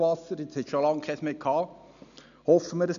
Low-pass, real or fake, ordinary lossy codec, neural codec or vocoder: 7.2 kHz; real; MP3, 96 kbps; none